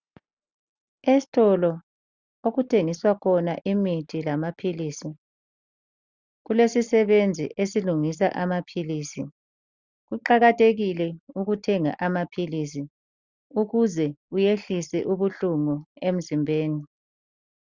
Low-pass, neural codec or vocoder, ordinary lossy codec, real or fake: 7.2 kHz; none; Opus, 64 kbps; real